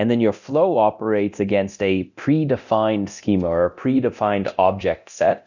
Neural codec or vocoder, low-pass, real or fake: codec, 24 kHz, 0.9 kbps, DualCodec; 7.2 kHz; fake